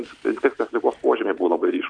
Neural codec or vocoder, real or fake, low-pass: none; real; 9.9 kHz